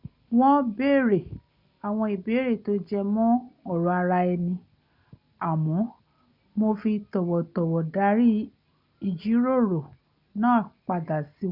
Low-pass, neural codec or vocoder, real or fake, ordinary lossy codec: 5.4 kHz; none; real; none